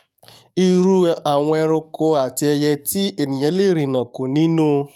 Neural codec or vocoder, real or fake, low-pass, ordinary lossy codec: codec, 44.1 kHz, 7.8 kbps, DAC; fake; 19.8 kHz; none